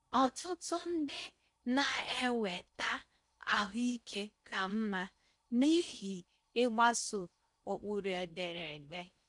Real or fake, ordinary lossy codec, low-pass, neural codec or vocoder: fake; none; 10.8 kHz; codec, 16 kHz in and 24 kHz out, 0.6 kbps, FocalCodec, streaming, 4096 codes